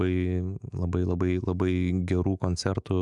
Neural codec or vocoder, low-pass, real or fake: autoencoder, 48 kHz, 128 numbers a frame, DAC-VAE, trained on Japanese speech; 10.8 kHz; fake